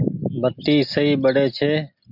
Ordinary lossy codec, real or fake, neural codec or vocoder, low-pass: MP3, 48 kbps; real; none; 5.4 kHz